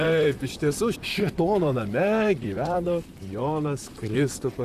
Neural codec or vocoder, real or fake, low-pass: vocoder, 44.1 kHz, 128 mel bands, Pupu-Vocoder; fake; 14.4 kHz